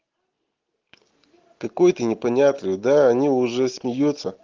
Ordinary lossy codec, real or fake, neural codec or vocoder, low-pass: Opus, 16 kbps; real; none; 7.2 kHz